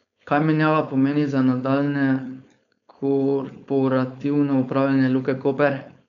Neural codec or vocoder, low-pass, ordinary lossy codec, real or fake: codec, 16 kHz, 4.8 kbps, FACodec; 7.2 kHz; none; fake